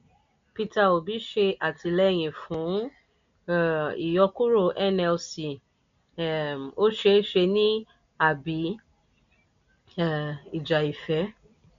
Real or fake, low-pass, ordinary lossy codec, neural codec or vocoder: real; 7.2 kHz; MP3, 64 kbps; none